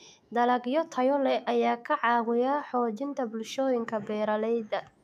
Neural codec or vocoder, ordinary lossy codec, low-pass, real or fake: autoencoder, 48 kHz, 128 numbers a frame, DAC-VAE, trained on Japanese speech; none; 14.4 kHz; fake